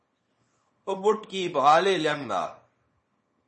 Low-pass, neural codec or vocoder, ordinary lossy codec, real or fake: 10.8 kHz; codec, 24 kHz, 0.9 kbps, WavTokenizer, small release; MP3, 32 kbps; fake